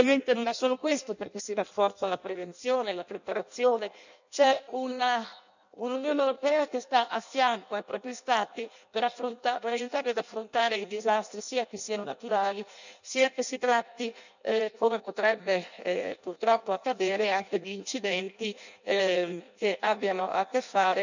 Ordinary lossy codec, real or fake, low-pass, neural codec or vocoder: none; fake; 7.2 kHz; codec, 16 kHz in and 24 kHz out, 0.6 kbps, FireRedTTS-2 codec